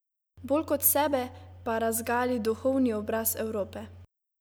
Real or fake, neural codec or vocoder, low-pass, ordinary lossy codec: real; none; none; none